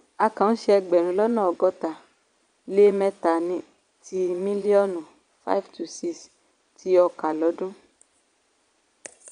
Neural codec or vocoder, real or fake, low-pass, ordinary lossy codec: vocoder, 22.05 kHz, 80 mel bands, Vocos; fake; 9.9 kHz; none